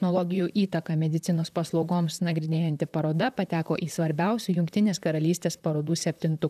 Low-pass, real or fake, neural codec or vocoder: 14.4 kHz; fake; vocoder, 44.1 kHz, 128 mel bands, Pupu-Vocoder